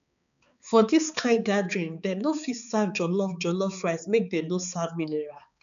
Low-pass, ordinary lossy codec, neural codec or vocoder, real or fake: 7.2 kHz; none; codec, 16 kHz, 4 kbps, X-Codec, HuBERT features, trained on balanced general audio; fake